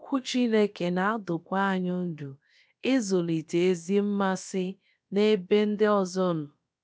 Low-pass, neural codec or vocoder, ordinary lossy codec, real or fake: none; codec, 16 kHz, about 1 kbps, DyCAST, with the encoder's durations; none; fake